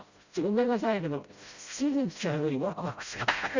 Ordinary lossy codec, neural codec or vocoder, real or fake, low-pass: Opus, 64 kbps; codec, 16 kHz, 0.5 kbps, FreqCodec, smaller model; fake; 7.2 kHz